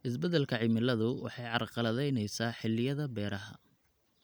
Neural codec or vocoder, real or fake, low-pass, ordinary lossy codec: none; real; none; none